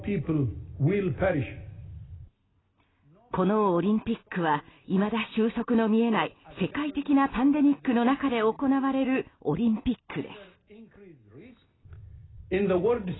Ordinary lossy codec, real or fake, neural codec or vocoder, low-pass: AAC, 16 kbps; real; none; 7.2 kHz